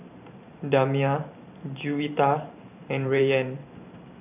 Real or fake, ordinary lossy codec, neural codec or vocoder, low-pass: fake; none; vocoder, 44.1 kHz, 128 mel bands every 512 samples, BigVGAN v2; 3.6 kHz